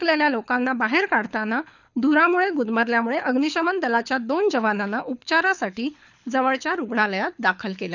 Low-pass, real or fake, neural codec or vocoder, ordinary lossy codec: 7.2 kHz; fake; codec, 24 kHz, 6 kbps, HILCodec; none